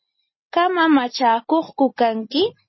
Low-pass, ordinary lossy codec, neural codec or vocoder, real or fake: 7.2 kHz; MP3, 24 kbps; none; real